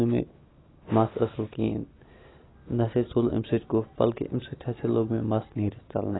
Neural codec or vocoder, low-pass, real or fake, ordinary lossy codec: none; 7.2 kHz; real; AAC, 16 kbps